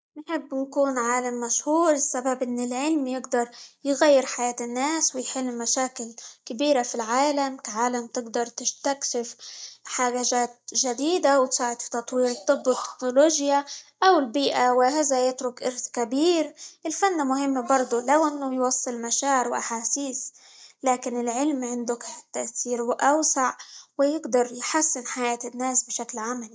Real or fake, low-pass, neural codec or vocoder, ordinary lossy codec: real; none; none; none